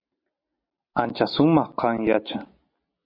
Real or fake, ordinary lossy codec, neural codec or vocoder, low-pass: real; MP3, 32 kbps; none; 5.4 kHz